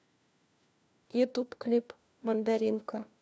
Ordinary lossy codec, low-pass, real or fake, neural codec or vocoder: none; none; fake; codec, 16 kHz, 1 kbps, FunCodec, trained on LibriTTS, 50 frames a second